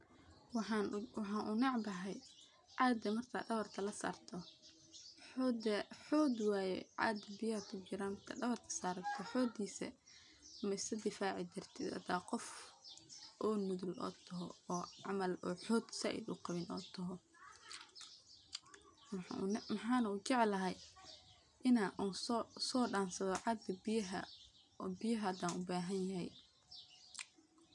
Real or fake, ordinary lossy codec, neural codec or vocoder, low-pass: real; none; none; 10.8 kHz